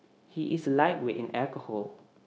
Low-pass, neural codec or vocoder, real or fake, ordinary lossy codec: none; codec, 16 kHz, 0.9 kbps, LongCat-Audio-Codec; fake; none